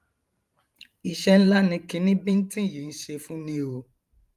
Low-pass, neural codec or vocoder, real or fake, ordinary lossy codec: 14.4 kHz; vocoder, 44.1 kHz, 128 mel bands every 512 samples, BigVGAN v2; fake; Opus, 32 kbps